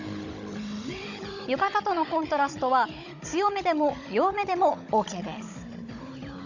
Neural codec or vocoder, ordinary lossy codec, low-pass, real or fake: codec, 16 kHz, 16 kbps, FunCodec, trained on Chinese and English, 50 frames a second; none; 7.2 kHz; fake